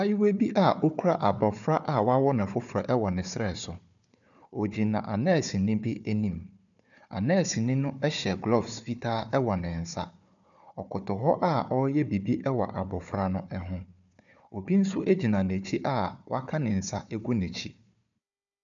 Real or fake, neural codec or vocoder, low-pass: fake; codec, 16 kHz, 4 kbps, FunCodec, trained on Chinese and English, 50 frames a second; 7.2 kHz